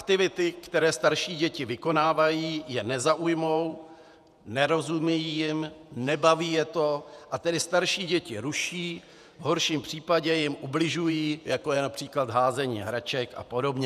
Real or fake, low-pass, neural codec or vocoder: fake; 14.4 kHz; vocoder, 48 kHz, 128 mel bands, Vocos